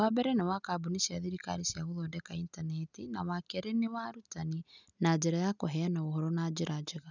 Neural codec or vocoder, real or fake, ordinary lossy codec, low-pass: none; real; none; 7.2 kHz